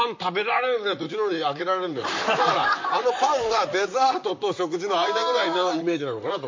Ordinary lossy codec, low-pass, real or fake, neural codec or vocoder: MP3, 48 kbps; 7.2 kHz; fake; vocoder, 44.1 kHz, 128 mel bands, Pupu-Vocoder